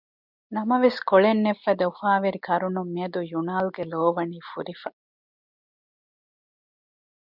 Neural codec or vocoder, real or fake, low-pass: none; real; 5.4 kHz